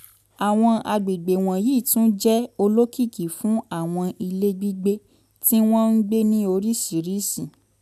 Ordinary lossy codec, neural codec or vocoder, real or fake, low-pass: none; none; real; 14.4 kHz